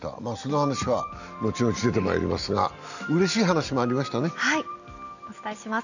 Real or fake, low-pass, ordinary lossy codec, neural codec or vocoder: real; 7.2 kHz; none; none